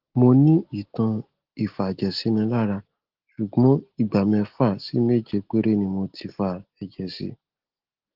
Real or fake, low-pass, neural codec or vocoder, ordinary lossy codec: real; 5.4 kHz; none; Opus, 16 kbps